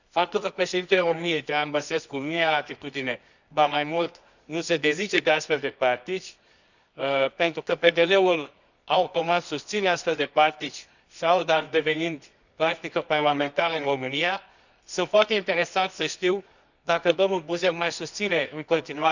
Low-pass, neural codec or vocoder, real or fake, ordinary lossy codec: 7.2 kHz; codec, 24 kHz, 0.9 kbps, WavTokenizer, medium music audio release; fake; none